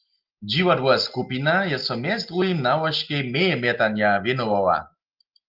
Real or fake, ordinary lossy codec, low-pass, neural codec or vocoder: real; Opus, 32 kbps; 5.4 kHz; none